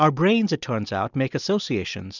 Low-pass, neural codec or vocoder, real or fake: 7.2 kHz; none; real